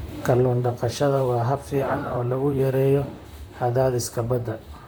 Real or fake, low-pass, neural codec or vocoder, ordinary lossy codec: fake; none; vocoder, 44.1 kHz, 128 mel bands, Pupu-Vocoder; none